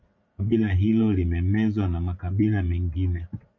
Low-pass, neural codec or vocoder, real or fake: 7.2 kHz; vocoder, 44.1 kHz, 80 mel bands, Vocos; fake